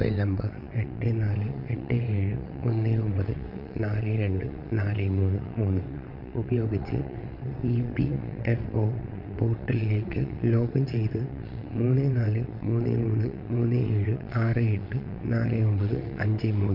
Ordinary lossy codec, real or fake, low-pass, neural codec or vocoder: none; fake; 5.4 kHz; vocoder, 22.05 kHz, 80 mel bands, WaveNeXt